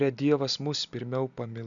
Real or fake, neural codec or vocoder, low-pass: real; none; 7.2 kHz